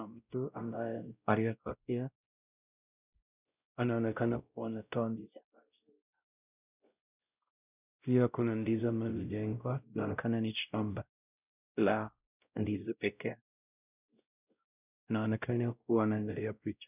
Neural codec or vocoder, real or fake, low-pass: codec, 16 kHz, 0.5 kbps, X-Codec, WavLM features, trained on Multilingual LibriSpeech; fake; 3.6 kHz